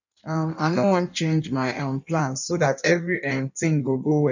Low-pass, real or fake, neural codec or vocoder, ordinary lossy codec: 7.2 kHz; fake; codec, 16 kHz in and 24 kHz out, 1.1 kbps, FireRedTTS-2 codec; none